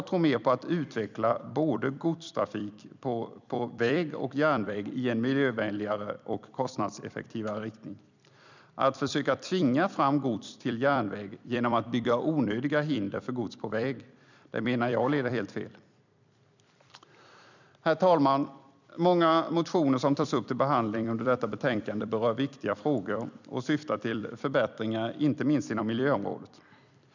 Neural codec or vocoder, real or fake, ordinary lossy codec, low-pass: vocoder, 44.1 kHz, 128 mel bands every 256 samples, BigVGAN v2; fake; none; 7.2 kHz